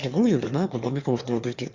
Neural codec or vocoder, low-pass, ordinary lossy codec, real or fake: autoencoder, 22.05 kHz, a latent of 192 numbers a frame, VITS, trained on one speaker; 7.2 kHz; Opus, 64 kbps; fake